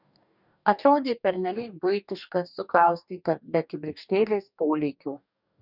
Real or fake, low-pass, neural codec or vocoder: fake; 5.4 kHz; codec, 44.1 kHz, 2.6 kbps, DAC